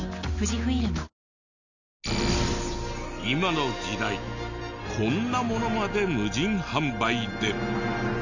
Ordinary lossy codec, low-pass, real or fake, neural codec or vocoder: none; 7.2 kHz; real; none